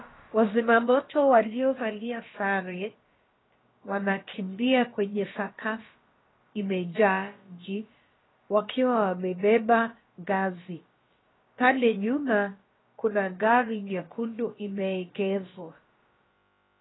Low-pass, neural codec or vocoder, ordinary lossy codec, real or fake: 7.2 kHz; codec, 16 kHz, about 1 kbps, DyCAST, with the encoder's durations; AAC, 16 kbps; fake